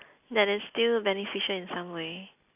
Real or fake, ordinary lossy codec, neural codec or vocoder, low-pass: real; none; none; 3.6 kHz